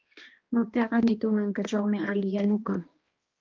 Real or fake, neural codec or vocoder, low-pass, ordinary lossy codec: fake; codec, 16 kHz, 1 kbps, X-Codec, HuBERT features, trained on balanced general audio; 7.2 kHz; Opus, 32 kbps